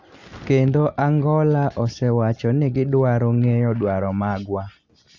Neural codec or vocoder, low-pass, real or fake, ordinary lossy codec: none; 7.2 kHz; real; Opus, 64 kbps